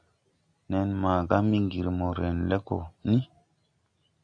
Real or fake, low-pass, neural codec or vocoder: real; 9.9 kHz; none